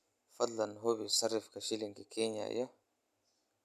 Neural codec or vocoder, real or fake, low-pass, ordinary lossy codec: none; real; 14.4 kHz; none